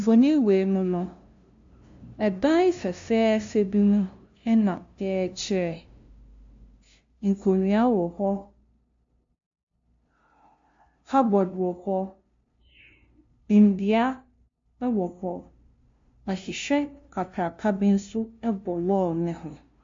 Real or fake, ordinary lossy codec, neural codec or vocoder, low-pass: fake; MP3, 48 kbps; codec, 16 kHz, 0.5 kbps, FunCodec, trained on LibriTTS, 25 frames a second; 7.2 kHz